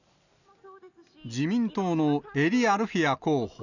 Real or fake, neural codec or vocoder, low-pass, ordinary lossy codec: real; none; 7.2 kHz; none